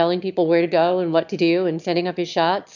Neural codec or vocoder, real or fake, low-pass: autoencoder, 22.05 kHz, a latent of 192 numbers a frame, VITS, trained on one speaker; fake; 7.2 kHz